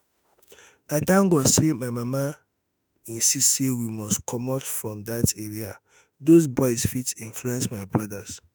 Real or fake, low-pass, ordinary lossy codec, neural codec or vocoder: fake; none; none; autoencoder, 48 kHz, 32 numbers a frame, DAC-VAE, trained on Japanese speech